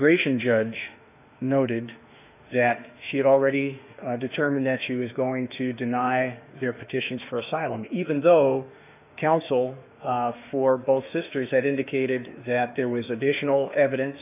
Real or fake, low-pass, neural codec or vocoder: fake; 3.6 kHz; autoencoder, 48 kHz, 32 numbers a frame, DAC-VAE, trained on Japanese speech